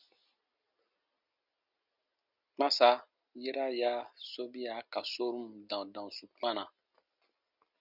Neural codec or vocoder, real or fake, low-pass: none; real; 5.4 kHz